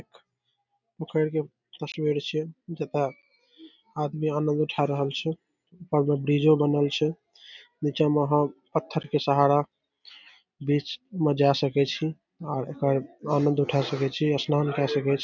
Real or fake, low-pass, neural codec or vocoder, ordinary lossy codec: real; 7.2 kHz; none; none